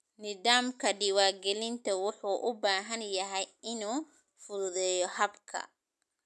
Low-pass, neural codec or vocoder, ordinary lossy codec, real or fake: none; none; none; real